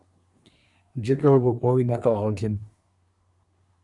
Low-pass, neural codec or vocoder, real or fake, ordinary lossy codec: 10.8 kHz; codec, 24 kHz, 1 kbps, SNAC; fake; MP3, 96 kbps